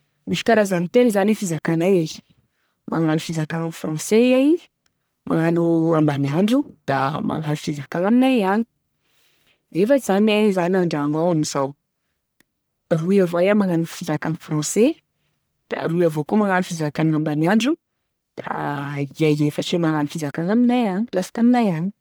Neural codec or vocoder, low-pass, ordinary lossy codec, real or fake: codec, 44.1 kHz, 1.7 kbps, Pupu-Codec; none; none; fake